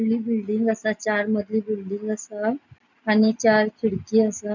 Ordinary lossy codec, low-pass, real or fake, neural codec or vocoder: none; 7.2 kHz; real; none